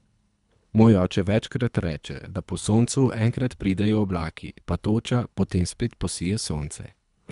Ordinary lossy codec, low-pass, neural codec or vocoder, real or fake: none; 10.8 kHz; codec, 24 kHz, 3 kbps, HILCodec; fake